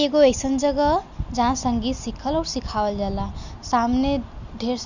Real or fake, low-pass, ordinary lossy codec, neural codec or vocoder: real; 7.2 kHz; none; none